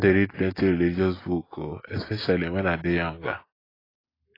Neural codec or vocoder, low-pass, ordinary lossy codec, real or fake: none; 5.4 kHz; AAC, 24 kbps; real